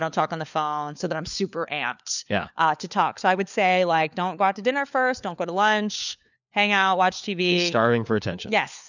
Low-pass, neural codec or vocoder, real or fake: 7.2 kHz; codec, 16 kHz, 4 kbps, FunCodec, trained on LibriTTS, 50 frames a second; fake